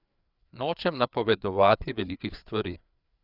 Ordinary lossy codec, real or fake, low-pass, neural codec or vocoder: none; fake; 5.4 kHz; codec, 16 kHz, 4 kbps, FreqCodec, larger model